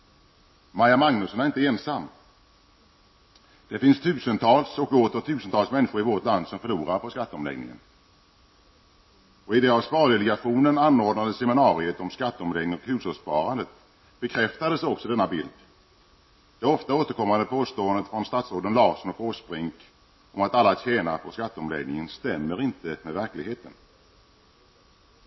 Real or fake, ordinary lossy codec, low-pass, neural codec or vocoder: real; MP3, 24 kbps; 7.2 kHz; none